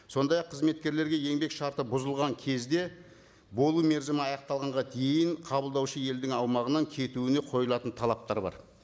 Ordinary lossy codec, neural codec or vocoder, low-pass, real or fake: none; none; none; real